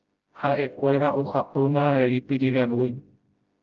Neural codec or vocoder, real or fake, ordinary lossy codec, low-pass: codec, 16 kHz, 0.5 kbps, FreqCodec, smaller model; fake; Opus, 24 kbps; 7.2 kHz